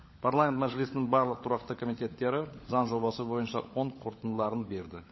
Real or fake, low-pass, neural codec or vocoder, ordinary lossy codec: fake; 7.2 kHz; codec, 16 kHz, 16 kbps, FunCodec, trained on LibriTTS, 50 frames a second; MP3, 24 kbps